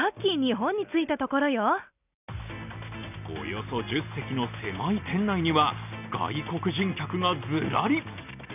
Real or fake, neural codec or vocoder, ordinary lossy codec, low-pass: real; none; none; 3.6 kHz